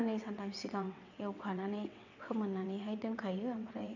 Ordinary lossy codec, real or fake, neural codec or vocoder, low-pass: none; fake; vocoder, 22.05 kHz, 80 mel bands, WaveNeXt; 7.2 kHz